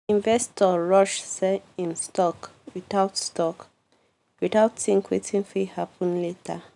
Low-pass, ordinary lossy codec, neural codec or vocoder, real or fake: 10.8 kHz; none; none; real